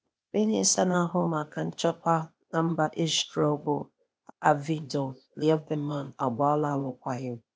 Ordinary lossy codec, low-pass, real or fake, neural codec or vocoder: none; none; fake; codec, 16 kHz, 0.8 kbps, ZipCodec